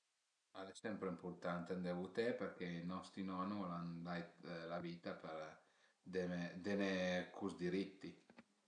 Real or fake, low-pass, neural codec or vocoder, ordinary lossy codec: real; 9.9 kHz; none; none